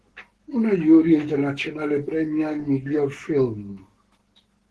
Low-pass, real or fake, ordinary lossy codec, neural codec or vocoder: 10.8 kHz; fake; Opus, 16 kbps; codec, 44.1 kHz, 7.8 kbps, Pupu-Codec